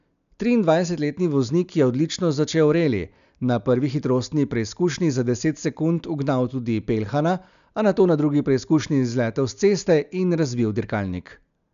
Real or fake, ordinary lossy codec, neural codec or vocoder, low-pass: real; none; none; 7.2 kHz